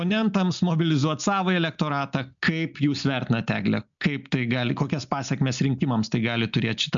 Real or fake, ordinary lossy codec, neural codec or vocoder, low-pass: real; MP3, 64 kbps; none; 7.2 kHz